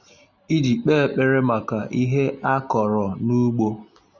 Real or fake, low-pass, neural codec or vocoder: real; 7.2 kHz; none